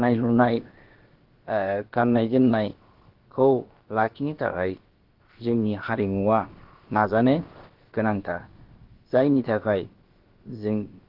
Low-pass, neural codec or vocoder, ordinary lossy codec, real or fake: 5.4 kHz; codec, 16 kHz, about 1 kbps, DyCAST, with the encoder's durations; Opus, 16 kbps; fake